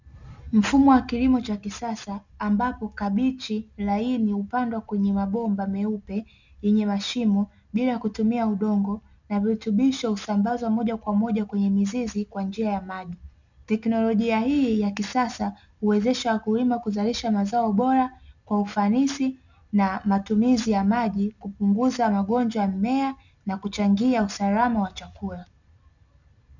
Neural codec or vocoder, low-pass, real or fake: none; 7.2 kHz; real